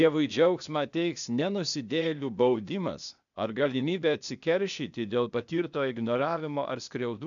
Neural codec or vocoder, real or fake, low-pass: codec, 16 kHz, 0.8 kbps, ZipCodec; fake; 7.2 kHz